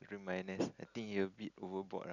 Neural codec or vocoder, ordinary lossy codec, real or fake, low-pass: none; none; real; 7.2 kHz